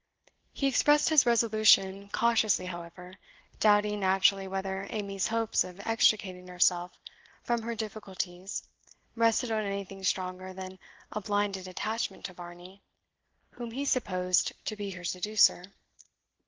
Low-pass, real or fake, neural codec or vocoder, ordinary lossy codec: 7.2 kHz; real; none; Opus, 16 kbps